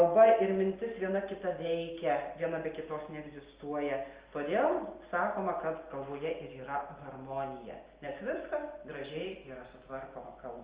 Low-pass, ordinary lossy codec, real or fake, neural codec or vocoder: 3.6 kHz; Opus, 24 kbps; real; none